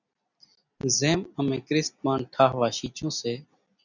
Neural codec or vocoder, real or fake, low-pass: none; real; 7.2 kHz